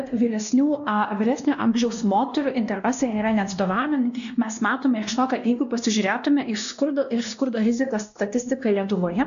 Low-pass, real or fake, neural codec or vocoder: 7.2 kHz; fake; codec, 16 kHz, 1 kbps, X-Codec, WavLM features, trained on Multilingual LibriSpeech